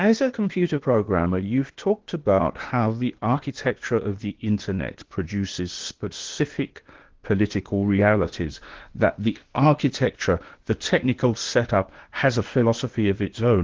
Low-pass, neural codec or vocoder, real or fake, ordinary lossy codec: 7.2 kHz; codec, 16 kHz, 0.8 kbps, ZipCodec; fake; Opus, 16 kbps